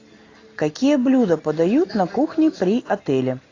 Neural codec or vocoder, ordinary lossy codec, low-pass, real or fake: none; AAC, 32 kbps; 7.2 kHz; real